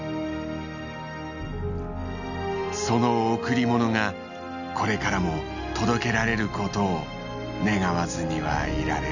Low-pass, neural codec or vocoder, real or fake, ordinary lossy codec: 7.2 kHz; none; real; none